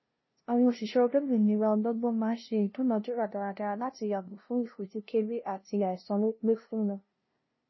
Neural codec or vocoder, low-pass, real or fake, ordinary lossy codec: codec, 16 kHz, 0.5 kbps, FunCodec, trained on LibriTTS, 25 frames a second; 7.2 kHz; fake; MP3, 24 kbps